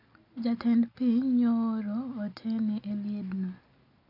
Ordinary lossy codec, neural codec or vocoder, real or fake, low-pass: none; none; real; 5.4 kHz